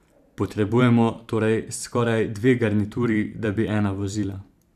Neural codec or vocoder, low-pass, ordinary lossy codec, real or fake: vocoder, 44.1 kHz, 128 mel bands every 512 samples, BigVGAN v2; 14.4 kHz; none; fake